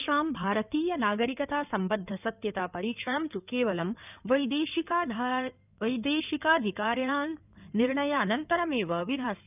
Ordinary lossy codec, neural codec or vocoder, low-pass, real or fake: none; codec, 16 kHz in and 24 kHz out, 2.2 kbps, FireRedTTS-2 codec; 3.6 kHz; fake